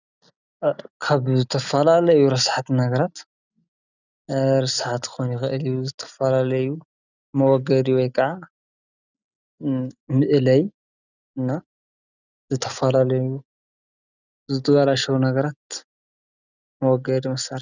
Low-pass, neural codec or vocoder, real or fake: 7.2 kHz; none; real